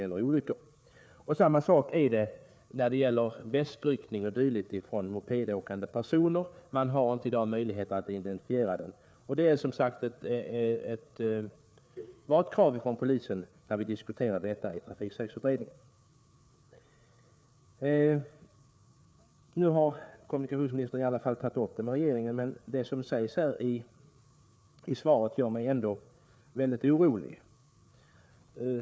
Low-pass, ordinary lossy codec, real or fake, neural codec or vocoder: none; none; fake; codec, 16 kHz, 4 kbps, FreqCodec, larger model